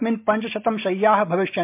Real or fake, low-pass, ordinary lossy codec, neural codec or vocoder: real; 3.6 kHz; MP3, 32 kbps; none